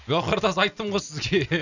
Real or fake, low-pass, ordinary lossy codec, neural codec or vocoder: real; 7.2 kHz; none; none